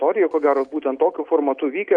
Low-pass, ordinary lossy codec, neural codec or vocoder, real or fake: 9.9 kHz; MP3, 96 kbps; none; real